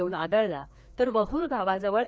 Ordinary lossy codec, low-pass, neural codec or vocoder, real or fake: none; none; codec, 16 kHz, 2 kbps, FreqCodec, larger model; fake